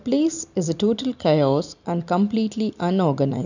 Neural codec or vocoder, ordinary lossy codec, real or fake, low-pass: none; none; real; 7.2 kHz